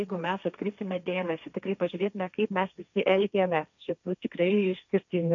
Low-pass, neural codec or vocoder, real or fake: 7.2 kHz; codec, 16 kHz, 1.1 kbps, Voila-Tokenizer; fake